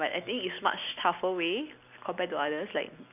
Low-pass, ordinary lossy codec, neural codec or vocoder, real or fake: 3.6 kHz; none; none; real